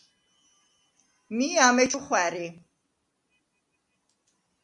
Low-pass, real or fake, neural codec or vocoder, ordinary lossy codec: 10.8 kHz; real; none; MP3, 64 kbps